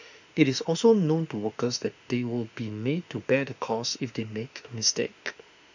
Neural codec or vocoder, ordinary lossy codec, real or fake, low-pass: autoencoder, 48 kHz, 32 numbers a frame, DAC-VAE, trained on Japanese speech; none; fake; 7.2 kHz